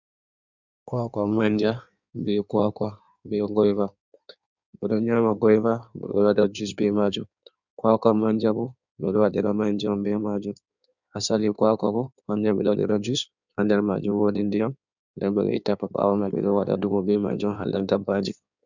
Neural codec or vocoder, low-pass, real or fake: codec, 16 kHz in and 24 kHz out, 1.1 kbps, FireRedTTS-2 codec; 7.2 kHz; fake